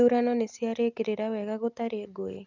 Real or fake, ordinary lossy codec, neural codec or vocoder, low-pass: real; none; none; 7.2 kHz